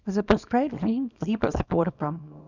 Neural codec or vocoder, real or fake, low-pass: codec, 24 kHz, 0.9 kbps, WavTokenizer, small release; fake; 7.2 kHz